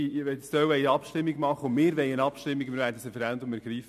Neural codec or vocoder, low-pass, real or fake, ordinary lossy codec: none; 14.4 kHz; real; MP3, 96 kbps